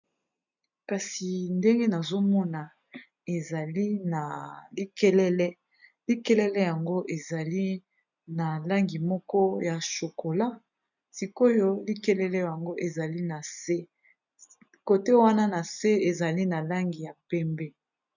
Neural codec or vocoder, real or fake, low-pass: none; real; 7.2 kHz